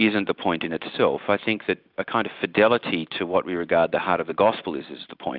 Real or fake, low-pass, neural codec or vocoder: real; 5.4 kHz; none